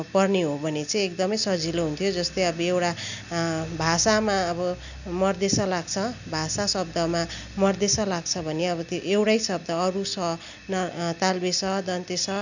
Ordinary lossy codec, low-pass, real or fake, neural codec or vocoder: none; 7.2 kHz; real; none